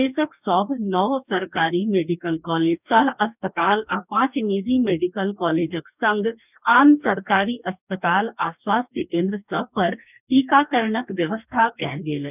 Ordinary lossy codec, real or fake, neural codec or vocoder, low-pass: none; fake; codec, 44.1 kHz, 2.6 kbps, DAC; 3.6 kHz